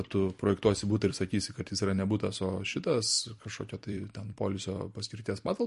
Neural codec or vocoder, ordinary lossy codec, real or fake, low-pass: vocoder, 44.1 kHz, 128 mel bands every 256 samples, BigVGAN v2; MP3, 48 kbps; fake; 14.4 kHz